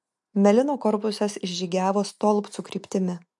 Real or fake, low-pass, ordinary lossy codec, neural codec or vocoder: real; 10.8 kHz; MP3, 64 kbps; none